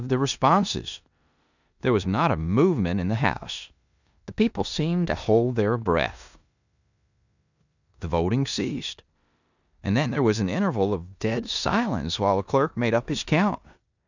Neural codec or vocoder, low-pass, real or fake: codec, 16 kHz in and 24 kHz out, 0.9 kbps, LongCat-Audio-Codec, four codebook decoder; 7.2 kHz; fake